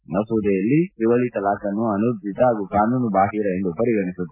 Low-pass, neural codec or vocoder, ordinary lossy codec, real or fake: 3.6 kHz; none; AAC, 32 kbps; real